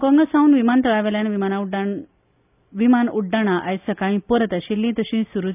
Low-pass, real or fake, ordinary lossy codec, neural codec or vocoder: 3.6 kHz; real; none; none